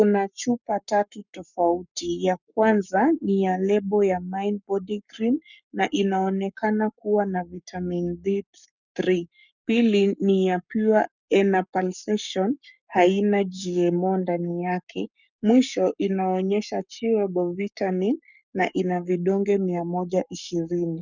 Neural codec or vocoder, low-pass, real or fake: codec, 44.1 kHz, 7.8 kbps, Pupu-Codec; 7.2 kHz; fake